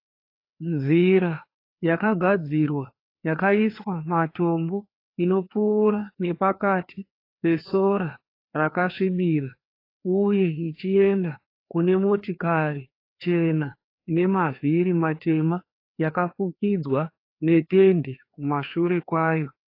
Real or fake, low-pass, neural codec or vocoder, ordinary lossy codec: fake; 5.4 kHz; codec, 16 kHz, 2 kbps, FreqCodec, larger model; AAC, 32 kbps